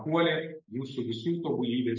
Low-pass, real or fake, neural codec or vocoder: 7.2 kHz; real; none